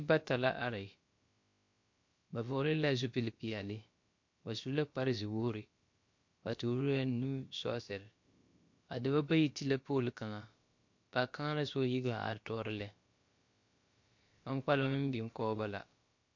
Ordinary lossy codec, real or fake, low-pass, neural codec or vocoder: MP3, 48 kbps; fake; 7.2 kHz; codec, 16 kHz, about 1 kbps, DyCAST, with the encoder's durations